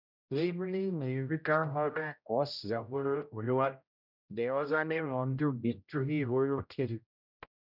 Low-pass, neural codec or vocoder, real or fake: 5.4 kHz; codec, 16 kHz, 0.5 kbps, X-Codec, HuBERT features, trained on general audio; fake